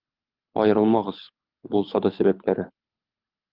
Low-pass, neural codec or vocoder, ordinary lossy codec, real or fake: 5.4 kHz; codec, 24 kHz, 6 kbps, HILCodec; Opus, 24 kbps; fake